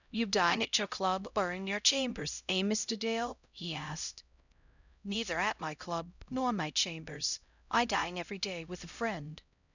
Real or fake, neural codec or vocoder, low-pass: fake; codec, 16 kHz, 0.5 kbps, X-Codec, HuBERT features, trained on LibriSpeech; 7.2 kHz